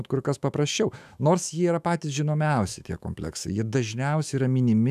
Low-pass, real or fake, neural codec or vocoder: 14.4 kHz; fake; autoencoder, 48 kHz, 128 numbers a frame, DAC-VAE, trained on Japanese speech